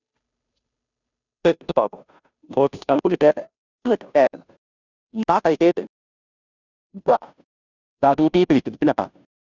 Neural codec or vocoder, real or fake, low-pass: codec, 16 kHz, 0.5 kbps, FunCodec, trained on Chinese and English, 25 frames a second; fake; 7.2 kHz